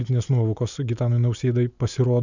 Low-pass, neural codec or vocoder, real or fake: 7.2 kHz; none; real